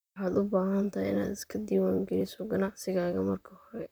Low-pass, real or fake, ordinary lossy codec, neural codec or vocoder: none; fake; none; vocoder, 44.1 kHz, 128 mel bands, Pupu-Vocoder